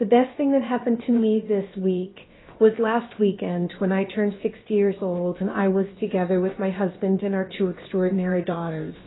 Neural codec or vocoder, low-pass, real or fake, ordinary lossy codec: codec, 16 kHz, about 1 kbps, DyCAST, with the encoder's durations; 7.2 kHz; fake; AAC, 16 kbps